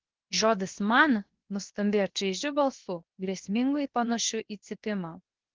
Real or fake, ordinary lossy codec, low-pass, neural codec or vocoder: fake; Opus, 16 kbps; 7.2 kHz; codec, 16 kHz, 0.7 kbps, FocalCodec